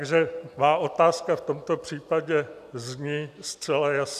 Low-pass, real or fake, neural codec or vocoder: 14.4 kHz; real; none